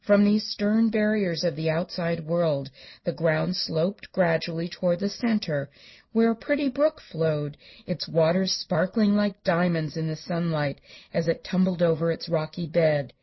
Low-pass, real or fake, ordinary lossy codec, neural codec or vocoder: 7.2 kHz; real; MP3, 24 kbps; none